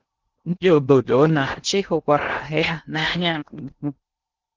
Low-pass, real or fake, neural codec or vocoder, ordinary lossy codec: 7.2 kHz; fake; codec, 16 kHz in and 24 kHz out, 0.6 kbps, FocalCodec, streaming, 2048 codes; Opus, 16 kbps